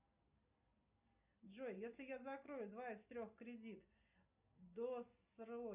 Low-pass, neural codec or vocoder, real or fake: 3.6 kHz; none; real